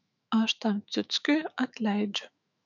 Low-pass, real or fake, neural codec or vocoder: 7.2 kHz; fake; autoencoder, 48 kHz, 128 numbers a frame, DAC-VAE, trained on Japanese speech